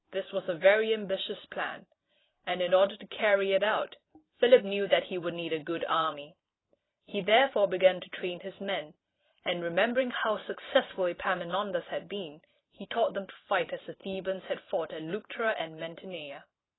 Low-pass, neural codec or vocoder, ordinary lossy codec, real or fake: 7.2 kHz; vocoder, 44.1 kHz, 128 mel bands every 512 samples, BigVGAN v2; AAC, 16 kbps; fake